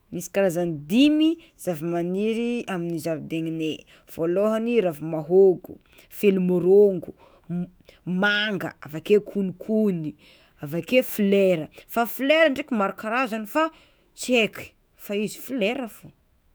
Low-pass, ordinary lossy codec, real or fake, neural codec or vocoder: none; none; fake; autoencoder, 48 kHz, 128 numbers a frame, DAC-VAE, trained on Japanese speech